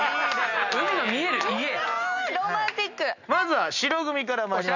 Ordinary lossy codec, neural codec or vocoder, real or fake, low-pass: none; none; real; 7.2 kHz